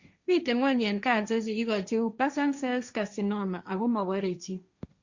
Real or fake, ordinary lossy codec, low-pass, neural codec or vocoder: fake; Opus, 64 kbps; 7.2 kHz; codec, 16 kHz, 1.1 kbps, Voila-Tokenizer